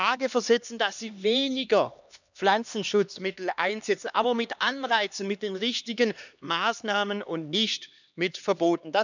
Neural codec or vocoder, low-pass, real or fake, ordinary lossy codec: codec, 16 kHz, 2 kbps, X-Codec, HuBERT features, trained on LibriSpeech; 7.2 kHz; fake; none